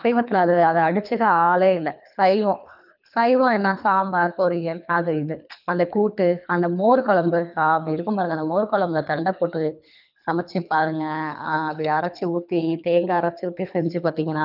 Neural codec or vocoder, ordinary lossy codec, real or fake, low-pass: codec, 24 kHz, 3 kbps, HILCodec; none; fake; 5.4 kHz